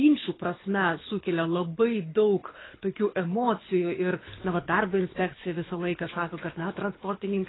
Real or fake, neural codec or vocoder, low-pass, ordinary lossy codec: fake; codec, 24 kHz, 6 kbps, HILCodec; 7.2 kHz; AAC, 16 kbps